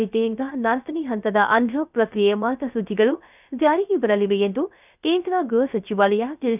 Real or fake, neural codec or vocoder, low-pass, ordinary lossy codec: fake; codec, 16 kHz, 0.3 kbps, FocalCodec; 3.6 kHz; none